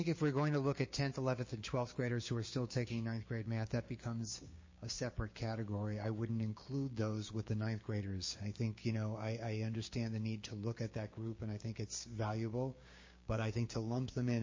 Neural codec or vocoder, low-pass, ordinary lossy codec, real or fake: codec, 16 kHz, 6 kbps, DAC; 7.2 kHz; MP3, 32 kbps; fake